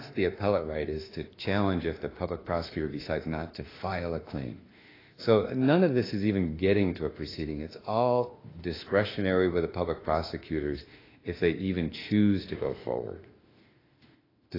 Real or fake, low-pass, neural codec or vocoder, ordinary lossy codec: fake; 5.4 kHz; autoencoder, 48 kHz, 32 numbers a frame, DAC-VAE, trained on Japanese speech; AAC, 24 kbps